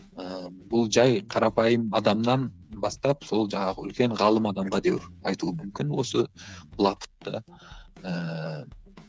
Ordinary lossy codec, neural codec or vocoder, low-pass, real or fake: none; codec, 16 kHz, 8 kbps, FreqCodec, smaller model; none; fake